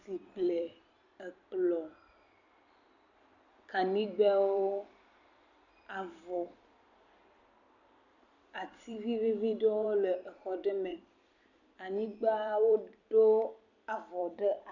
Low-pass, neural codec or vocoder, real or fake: 7.2 kHz; vocoder, 44.1 kHz, 128 mel bands every 256 samples, BigVGAN v2; fake